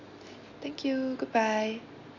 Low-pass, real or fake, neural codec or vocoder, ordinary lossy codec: 7.2 kHz; real; none; none